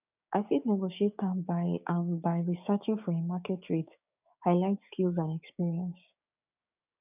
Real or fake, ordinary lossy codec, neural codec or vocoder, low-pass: fake; none; codec, 16 kHz, 6 kbps, DAC; 3.6 kHz